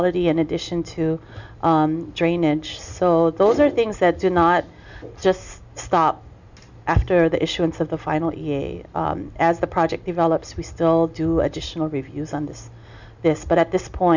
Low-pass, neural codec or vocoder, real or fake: 7.2 kHz; none; real